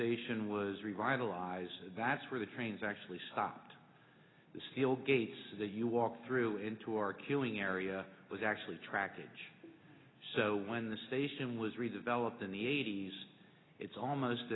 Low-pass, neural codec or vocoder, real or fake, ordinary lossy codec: 7.2 kHz; none; real; AAC, 16 kbps